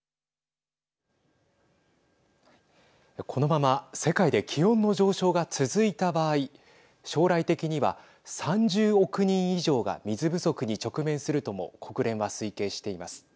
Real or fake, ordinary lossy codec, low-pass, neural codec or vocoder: real; none; none; none